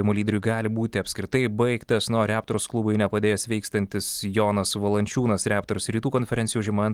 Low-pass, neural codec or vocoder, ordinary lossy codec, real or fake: 19.8 kHz; none; Opus, 24 kbps; real